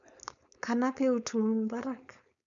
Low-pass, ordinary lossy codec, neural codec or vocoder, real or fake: 7.2 kHz; none; codec, 16 kHz, 4.8 kbps, FACodec; fake